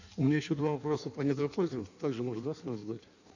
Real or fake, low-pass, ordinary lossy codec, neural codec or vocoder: fake; 7.2 kHz; none; codec, 16 kHz in and 24 kHz out, 1.1 kbps, FireRedTTS-2 codec